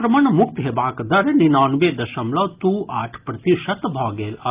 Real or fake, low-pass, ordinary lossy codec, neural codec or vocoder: real; 3.6 kHz; Opus, 24 kbps; none